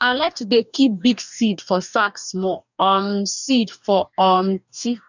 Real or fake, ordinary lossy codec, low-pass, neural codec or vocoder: fake; none; 7.2 kHz; codec, 44.1 kHz, 2.6 kbps, DAC